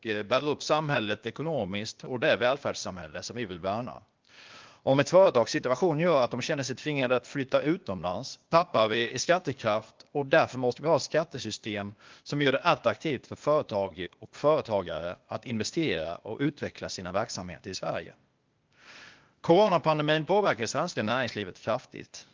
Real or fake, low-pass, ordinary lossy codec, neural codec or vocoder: fake; 7.2 kHz; Opus, 24 kbps; codec, 16 kHz, 0.8 kbps, ZipCodec